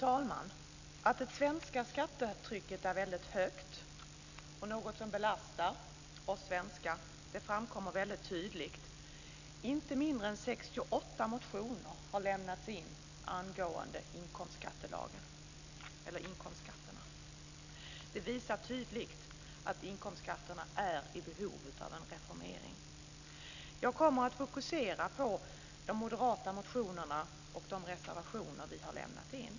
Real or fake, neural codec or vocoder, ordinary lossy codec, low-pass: real; none; none; 7.2 kHz